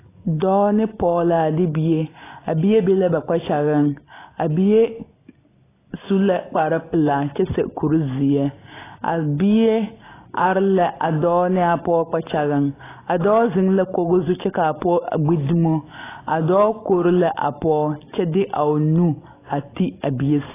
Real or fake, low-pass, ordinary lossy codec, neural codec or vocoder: real; 3.6 kHz; AAC, 16 kbps; none